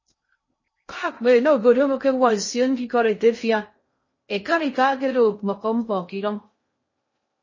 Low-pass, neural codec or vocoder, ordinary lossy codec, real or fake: 7.2 kHz; codec, 16 kHz in and 24 kHz out, 0.6 kbps, FocalCodec, streaming, 2048 codes; MP3, 32 kbps; fake